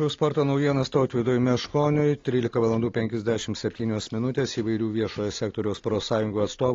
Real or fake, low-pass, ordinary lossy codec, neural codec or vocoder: real; 7.2 kHz; AAC, 32 kbps; none